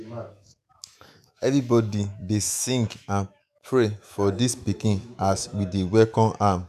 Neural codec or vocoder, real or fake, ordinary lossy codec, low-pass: autoencoder, 48 kHz, 128 numbers a frame, DAC-VAE, trained on Japanese speech; fake; none; 14.4 kHz